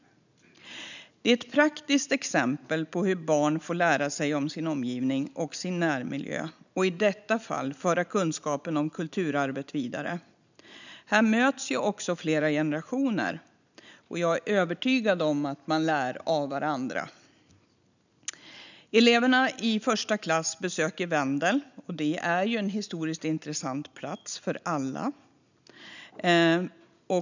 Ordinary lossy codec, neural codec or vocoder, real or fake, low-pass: none; none; real; 7.2 kHz